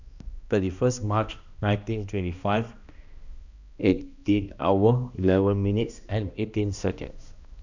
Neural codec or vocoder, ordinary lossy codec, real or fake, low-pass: codec, 16 kHz, 1 kbps, X-Codec, HuBERT features, trained on balanced general audio; none; fake; 7.2 kHz